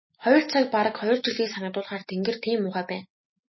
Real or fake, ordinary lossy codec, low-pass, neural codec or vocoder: real; MP3, 24 kbps; 7.2 kHz; none